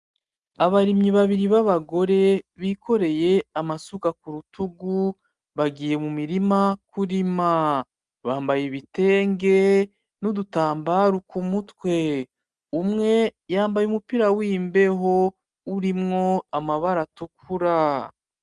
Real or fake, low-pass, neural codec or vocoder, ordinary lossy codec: real; 10.8 kHz; none; Opus, 32 kbps